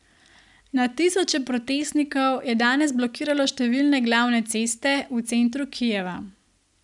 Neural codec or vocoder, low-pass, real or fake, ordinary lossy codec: none; 10.8 kHz; real; none